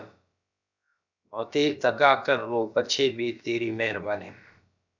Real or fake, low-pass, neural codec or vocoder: fake; 7.2 kHz; codec, 16 kHz, about 1 kbps, DyCAST, with the encoder's durations